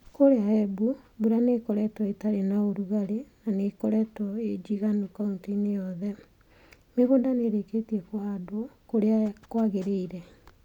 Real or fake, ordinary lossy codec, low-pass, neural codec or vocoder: real; none; 19.8 kHz; none